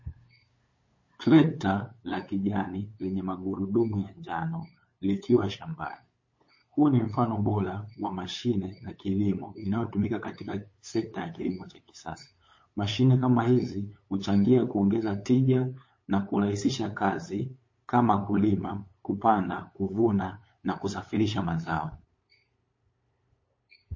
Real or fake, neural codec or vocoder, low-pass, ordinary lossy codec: fake; codec, 16 kHz, 8 kbps, FunCodec, trained on LibriTTS, 25 frames a second; 7.2 kHz; MP3, 32 kbps